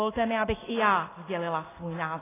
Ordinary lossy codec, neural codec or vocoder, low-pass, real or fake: AAC, 16 kbps; none; 3.6 kHz; real